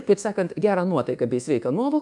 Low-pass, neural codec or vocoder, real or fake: 10.8 kHz; codec, 24 kHz, 1.2 kbps, DualCodec; fake